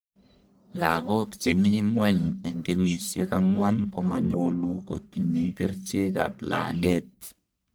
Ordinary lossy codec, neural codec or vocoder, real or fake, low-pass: none; codec, 44.1 kHz, 1.7 kbps, Pupu-Codec; fake; none